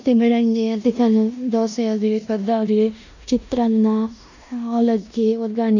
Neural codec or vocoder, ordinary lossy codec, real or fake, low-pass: codec, 16 kHz in and 24 kHz out, 0.9 kbps, LongCat-Audio-Codec, four codebook decoder; none; fake; 7.2 kHz